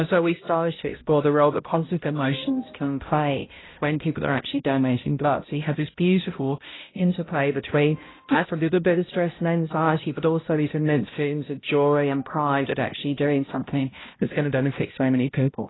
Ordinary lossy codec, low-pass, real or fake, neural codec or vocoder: AAC, 16 kbps; 7.2 kHz; fake; codec, 16 kHz, 0.5 kbps, X-Codec, HuBERT features, trained on balanced general audio